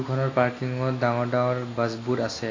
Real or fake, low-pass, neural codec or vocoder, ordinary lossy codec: real; 7.2 kHz; none; AAC, 32 kbps